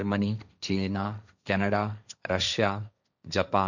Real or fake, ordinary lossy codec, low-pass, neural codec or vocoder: fake; none; 7.2 kHz; codec, 16 kHz, 1.1 kbps, Voila-Tokenizer